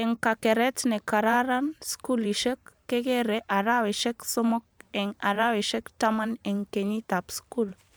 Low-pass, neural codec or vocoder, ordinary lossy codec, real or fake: none; vocoder, 44.1 kHz, 128 mel bands every 512 samples, BigVGAN v2; none; fake